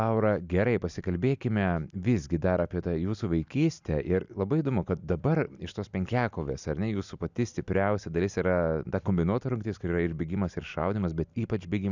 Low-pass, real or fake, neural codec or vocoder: 7.2 kHz; real; none